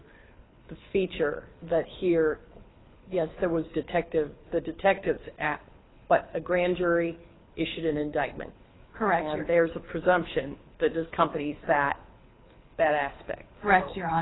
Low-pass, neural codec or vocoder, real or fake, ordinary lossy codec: 7.2 kHz; codec, 24 kHz, 6 kbps, HILCodec; fake; AAC, 16 kbps